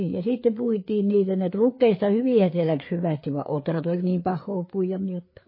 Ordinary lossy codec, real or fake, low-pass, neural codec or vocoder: MP3, 24 kbps; fake; 5.4 kHz; codec, 16 kHz, 4 kbps, FreqCodec, larger model